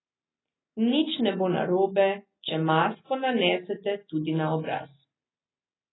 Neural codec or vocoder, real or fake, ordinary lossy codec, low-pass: none; real; AAC, 16 kbps; 7.2 kHz